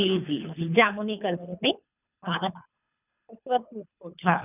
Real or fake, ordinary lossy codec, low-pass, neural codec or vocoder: fake; none; 3.6 kHz; codec, 24 kHz, 3 kbps, HILCodec